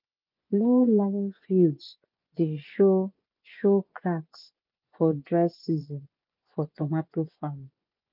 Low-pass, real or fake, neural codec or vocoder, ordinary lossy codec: 5.4 kHz; fake; vocoder, 24 kHz, 100 mel bands, Vocos; none